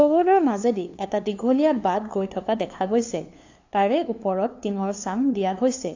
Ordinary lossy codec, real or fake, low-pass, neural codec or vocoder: AAC, 48 kbps; fake; 7.2 kHz; codec, 16 kHz, 2 kbps, FunCodec, trained on LibriTTS, 25 frames a second